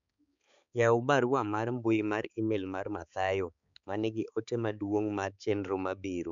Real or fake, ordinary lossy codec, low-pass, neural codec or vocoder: fake; none; 7.2 kHz; codec, 16 kHz, 4 kbps, X-Codec, HuBERT features, trained on balanced general audio